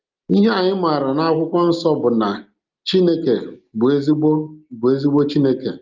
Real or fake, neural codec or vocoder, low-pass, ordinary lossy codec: real; none; 7.2 kHz; Opus, 32 kbps